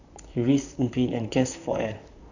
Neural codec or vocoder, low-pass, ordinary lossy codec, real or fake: vocoder, 44.1 kHz, 128 mel bands, Pupu-Vocoder; 7.2 kHz; none; fake